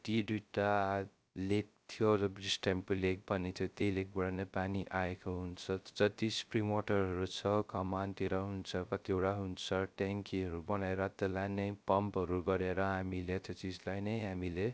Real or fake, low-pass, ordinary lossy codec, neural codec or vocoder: fake; none; none; codec, 16 kHz, 0.3 kbps, FocalCodec